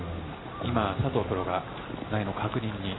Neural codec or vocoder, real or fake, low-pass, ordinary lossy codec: none; real; 7.2 kHz; AAC, 16 kbps